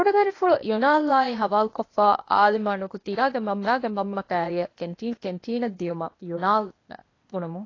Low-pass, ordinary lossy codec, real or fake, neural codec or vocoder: 7.2 kHz; AAC, 32 kbps; fake; codec, 16 kHz, 0.8 kbps, ZipCodec